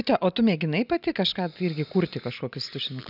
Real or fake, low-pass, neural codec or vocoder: real; 5.4 kHz; none